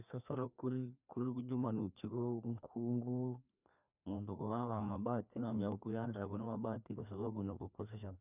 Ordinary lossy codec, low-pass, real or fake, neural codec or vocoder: none; 3.6 kHz; fake; codec, 16 kHz, 2 kbps, FreqCodec, larger model